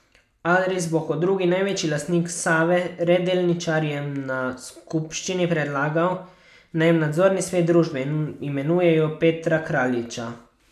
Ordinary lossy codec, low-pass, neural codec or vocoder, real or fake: none; 14.4 kHz; none; real